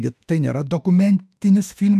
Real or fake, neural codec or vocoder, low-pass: fake; autoencoder, 48 kHz, 32 numbers a frame, DAC-VAE, trained on Japanese speech; 14.4 kHz